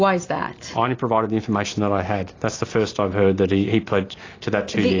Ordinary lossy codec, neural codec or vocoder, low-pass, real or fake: AAC, 32 kbps; none; 7.2 kHz; real